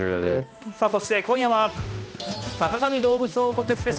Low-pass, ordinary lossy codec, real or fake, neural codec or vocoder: none; none; fake; codec, 16 kHz, 1 kbps, X-Codec, HuBERT features, trained on balanced general audio